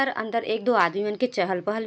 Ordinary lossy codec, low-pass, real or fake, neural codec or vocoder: none; none; real; none